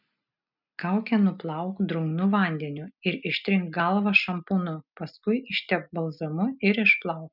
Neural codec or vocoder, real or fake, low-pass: none; real; 5.4 kHz